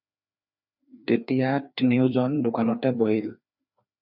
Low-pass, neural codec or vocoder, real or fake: 5.4 kHz; codec, 16 kHz, 2 kbps, FreqCodec, larger model; fake